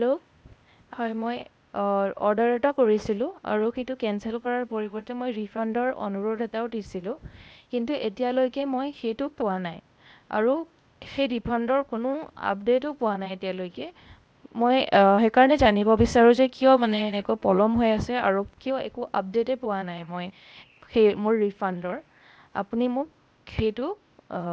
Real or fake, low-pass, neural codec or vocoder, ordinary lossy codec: fake; none; codec, 16 kHz, 0.8 kbps, ZipCodec; none